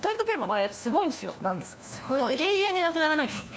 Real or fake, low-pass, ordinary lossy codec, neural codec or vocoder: fake; none; none; codec, 16 kHz, 1 kbps, FunCodec, trained on LibriTTS, 50 frames a second